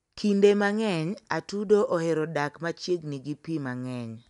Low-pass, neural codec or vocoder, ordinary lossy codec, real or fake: 10.8 kHz; none; none; real